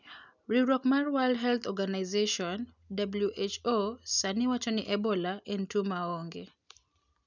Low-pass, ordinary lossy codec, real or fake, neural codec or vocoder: 7.2 kHz; none; real; none